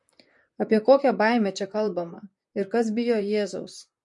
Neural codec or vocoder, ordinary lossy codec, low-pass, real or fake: vocoder, 44.1 kHz, 128 mel bands, Pupu-Vocoder; MP3, 48 kbps; 10.8 kHz; fake